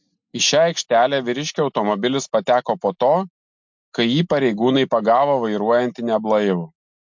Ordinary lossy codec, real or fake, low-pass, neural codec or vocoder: MP3, 64 kbps; real; 7.2 kHz; none